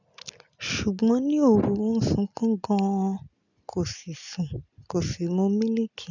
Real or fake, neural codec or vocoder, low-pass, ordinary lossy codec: real; none; 7.2 kHz; none